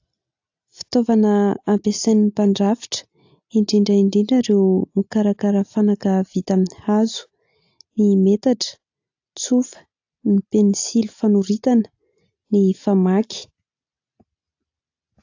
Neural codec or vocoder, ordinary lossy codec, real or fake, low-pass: none; AAC, 48 kbps; real; 7.2 kHz